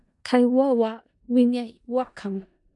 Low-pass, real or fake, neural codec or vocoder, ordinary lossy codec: 10.8 kHz; fake; codec, 16 kHz in and 24 kHz out, 0.4 kbps, LongCat-Audio-Codec, four codebook decoder; MP3, 96 kbps